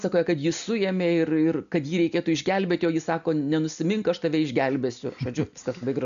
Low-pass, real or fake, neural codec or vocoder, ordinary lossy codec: 7.2 kHz; real; none; AAC, 96 kbps